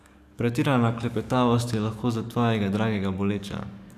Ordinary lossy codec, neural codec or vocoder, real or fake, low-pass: none; codec, 44.1 kHz, 7.8 kbps, DAC; fake; 14.4 kHz